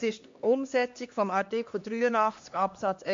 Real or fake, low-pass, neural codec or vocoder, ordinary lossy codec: fake; 7.2 kHz; codec, 16 kHz, 2 kbps, X-Codec, HuBERT features, trained on LibriSpeech; MP3, 48 kbps